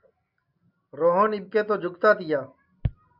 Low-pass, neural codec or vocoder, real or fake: 5.4 kHz; none; real